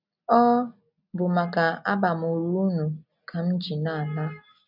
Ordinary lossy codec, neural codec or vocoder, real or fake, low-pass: none; none; real; 5.4 kHz